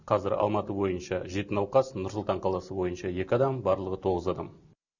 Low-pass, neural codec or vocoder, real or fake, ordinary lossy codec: 7.2 kHz; none; real; none